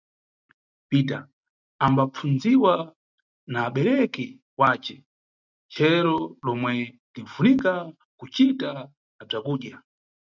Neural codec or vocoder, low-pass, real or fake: none; 7.2 kHz; real